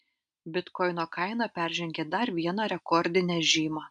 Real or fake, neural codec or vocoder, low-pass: real; none; 9.9 kHz